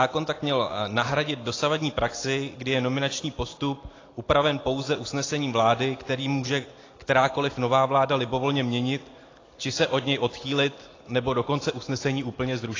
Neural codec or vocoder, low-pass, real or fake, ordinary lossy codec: none; 7.2 kHz; real; AAC, 32 kbps